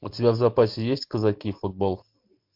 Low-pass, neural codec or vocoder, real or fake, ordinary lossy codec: 5.4 kHz; none; real; MP3, 48 kbps